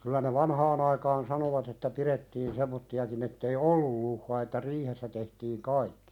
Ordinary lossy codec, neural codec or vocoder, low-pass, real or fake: none; none; 19.8 kHz; real